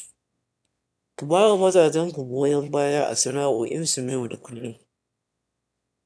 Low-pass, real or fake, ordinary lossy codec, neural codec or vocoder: none; fake; none; autoencoder, 22.05 kHz, a latent of 192 numbers a frame, VITS, trained on one speaker